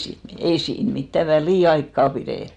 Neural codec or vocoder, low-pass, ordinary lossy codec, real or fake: none; 9.9 kHz; none; real